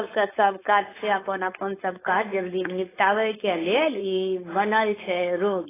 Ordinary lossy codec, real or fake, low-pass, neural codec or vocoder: AAC, 16 kbps; fake; 3.6 kHz; codec, 16 kHz, 4.8 kbps, FACodec